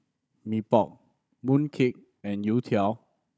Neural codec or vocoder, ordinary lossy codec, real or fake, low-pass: codec, 16 kHz, 16 kbps, FunCodec, trained on Chinese and English, 50 frames a second; none; fake; none